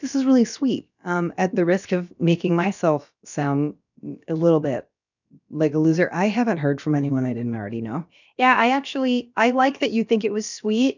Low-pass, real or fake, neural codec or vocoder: 7.2 kHz; fake; codec, 16 kHz, about 1 kbps, DyCAST, with the encoder's durations